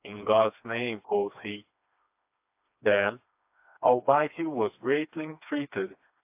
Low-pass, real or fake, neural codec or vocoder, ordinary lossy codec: 3.6 kHz; fake; codec, 16 kHz, 2 kbps, FreqCodec, smaller model; AAC, 32 kbps